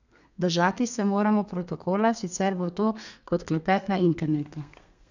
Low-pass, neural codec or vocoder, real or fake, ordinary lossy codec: 7.2 kHz; codec, 32 kHz, 1.9 kbps, SNAC; fake; none